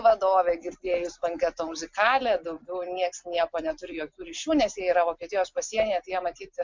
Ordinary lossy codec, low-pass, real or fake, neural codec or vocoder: MP3, 64 kbps; 7.2 kHz; real; none